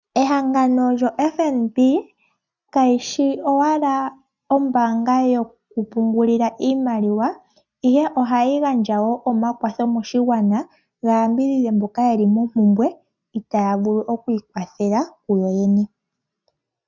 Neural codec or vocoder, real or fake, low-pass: none; real; 7.2 kHz